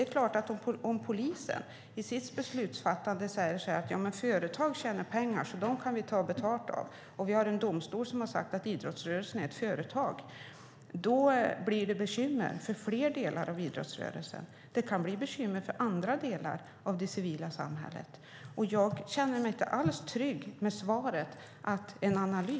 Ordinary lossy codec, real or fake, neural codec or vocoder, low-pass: none; real; none; none